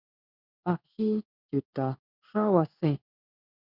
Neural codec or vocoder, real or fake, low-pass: codec, 16 kHz in and 24 kHz out, 1 kbps, XY-Tokenizer; fake; 5.4 kHz